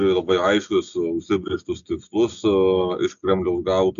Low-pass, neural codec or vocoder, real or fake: 7.2 kHz; none; real